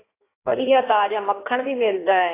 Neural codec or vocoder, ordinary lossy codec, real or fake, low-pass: codec, 16 kHz in and 24 kHz out, 1.1 kbps, FireRedTTS-2 codec; AAC, 16 kbps; fake; 3.6 kHz